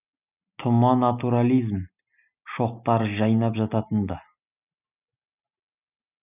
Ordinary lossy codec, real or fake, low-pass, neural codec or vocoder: none; real; 3.6 kHz; none